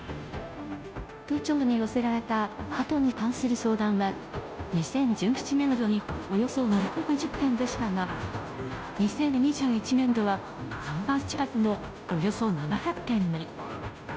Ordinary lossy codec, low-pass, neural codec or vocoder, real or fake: none; none; codec, 16 kHz, 0.5 kbps, FunCodec, trained on Chinese and English, 25 frames a second; fake